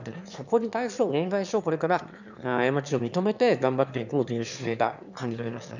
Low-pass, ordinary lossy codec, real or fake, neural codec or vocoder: 7.2 kHz; none; fake; autoencoder, 22.05 kHz, a latent of 192 numbers a frame, VITS, trained on one speaker